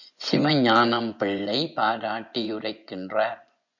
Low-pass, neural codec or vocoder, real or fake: 7.2 kHz; vocoder, 24 kHz, 100 mel bands, Vocos; fake